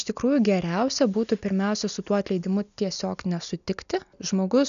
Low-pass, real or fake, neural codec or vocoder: 7.2 kHz; real; none